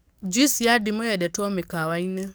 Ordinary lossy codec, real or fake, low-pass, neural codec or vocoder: none; fake; none; codec, 44.1 kHz, 7.8 kbps, DAC